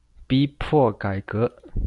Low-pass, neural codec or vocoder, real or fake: 10.8 kHz; none; real